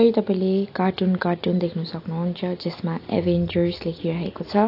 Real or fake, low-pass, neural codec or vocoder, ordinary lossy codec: real; 5.4 kHz; none; AAC, 32 kbps